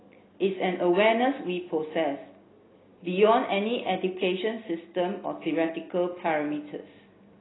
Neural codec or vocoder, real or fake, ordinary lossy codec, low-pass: none; real; AAC, 16 kbps; 7.2 kHz